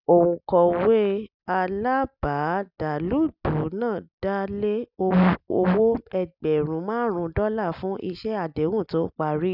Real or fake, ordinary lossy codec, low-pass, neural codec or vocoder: real; none; 5.4 kHz; none